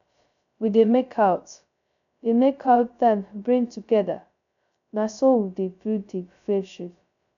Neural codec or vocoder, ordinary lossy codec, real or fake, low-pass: codec, 16 kHz, 0.2 kbps, FocalCodec; none; fake; 7.2 kHz